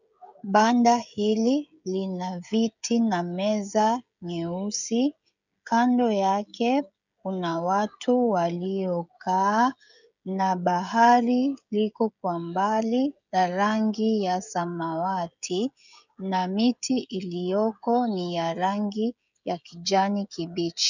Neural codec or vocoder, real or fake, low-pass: codec, 16 kHz, 16 kbps, FreqCodec, smaller model; fake; 7.2 kHz